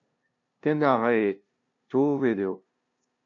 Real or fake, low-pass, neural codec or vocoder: fake; 7.2 kHz; codec, 16 kHz, 0.5 kbps, FunCodec, trained on LibriTTS, 25 frames a second